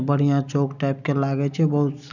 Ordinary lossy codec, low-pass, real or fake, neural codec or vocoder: none; 7.2 kHz; real; none